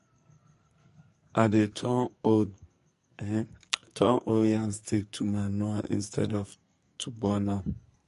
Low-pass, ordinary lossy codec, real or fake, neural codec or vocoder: 14.4 kHz; MP3, 48 kbps; fake; codec, 44.1 kHz, 2.6 kbps, SNAC